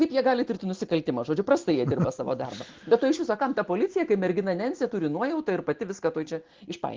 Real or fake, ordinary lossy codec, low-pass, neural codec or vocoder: real; Opus, 16 kbps; 7.2 kHz; none